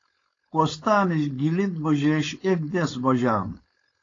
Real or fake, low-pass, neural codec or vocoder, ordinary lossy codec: fake; 7.2 kHz; codec, 16 kHz, 4.8 kbps, FACodec; AAC, 32 kbps